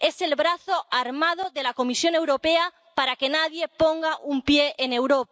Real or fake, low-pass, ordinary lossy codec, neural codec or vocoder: real; none; none; none